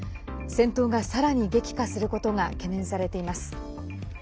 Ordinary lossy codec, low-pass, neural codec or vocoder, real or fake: none; none; none; real